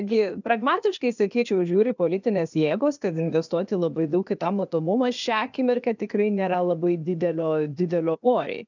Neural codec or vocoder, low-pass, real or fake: codec, 16 kHz, 0.8 kbps, ZipCodec; 7.2 kHz; fake